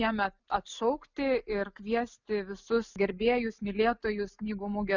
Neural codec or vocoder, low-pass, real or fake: none; 7.2 kHz; real